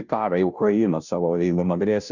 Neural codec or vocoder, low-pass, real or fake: codec, 16 kHz, 0.5 kbps, FunCodec, trained on Chinese and English, 25 frames a second; 7.2 kHz; fake